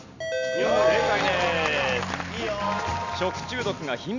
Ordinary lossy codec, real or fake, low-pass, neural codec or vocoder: none; real; 7.2 kHz; none